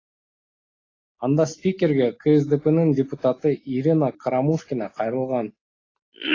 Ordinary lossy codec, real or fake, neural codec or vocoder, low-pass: AAC, 32 kbps; real; none; 7.2 kHz